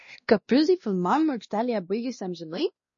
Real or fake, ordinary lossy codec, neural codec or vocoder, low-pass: fake; MP3, 32 kbps; codec, 16 kHz, 1 kbps, X-Codec, WavLM features, trained on Multilingual LibriSpeech; 7.2 kHz